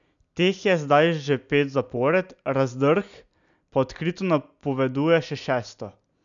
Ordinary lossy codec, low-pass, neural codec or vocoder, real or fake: none; 7.2 kHz; none; real